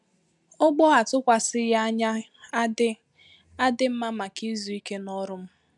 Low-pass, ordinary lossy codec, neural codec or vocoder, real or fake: 10.8 kHz; none; none; real